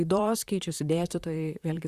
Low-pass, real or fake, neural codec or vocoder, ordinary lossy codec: 14.4 kHz; fake; vocoder, 44.1 kHz, 128 mel bands, Pupu-Vocoder; Opus, 64 kbps